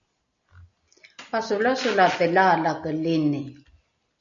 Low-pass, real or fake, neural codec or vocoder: 7.2 kHz; real; none